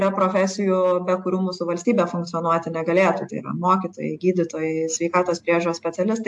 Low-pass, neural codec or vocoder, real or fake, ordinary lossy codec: 10.8 kHz; none; real; AAC, 64 kbps